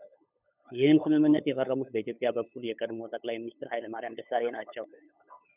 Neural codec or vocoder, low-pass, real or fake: codec, 16 kHz, 8 kbps, FunCodec, trained on LibriTTS, 25 frames a second; 3.6 kHz; fake